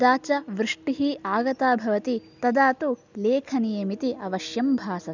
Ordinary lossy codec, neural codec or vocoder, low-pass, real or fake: none; none; 7.2 kHz; real